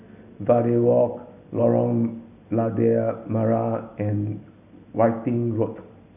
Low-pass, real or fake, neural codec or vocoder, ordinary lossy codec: 3.6 kHz; real; none; none